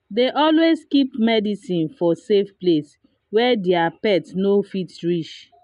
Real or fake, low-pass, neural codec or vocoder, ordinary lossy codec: real; 10.8 kHz; none; MP3, 96 kbps